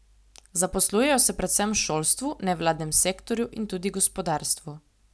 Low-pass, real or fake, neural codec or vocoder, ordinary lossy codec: none; real; none; none